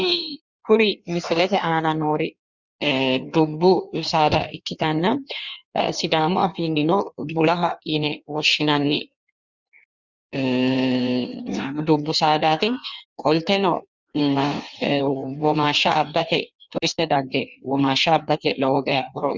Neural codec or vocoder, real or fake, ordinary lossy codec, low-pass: codec, 16 kHz in and 24 kHz out, 1.1 kbps, FireRedTTS-2 codec; fake; Opus, 64 kbps; 7.2 kHz